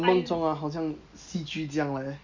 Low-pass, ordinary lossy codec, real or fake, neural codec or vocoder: 7.2 kHz; Opus, 64 kbps; real; none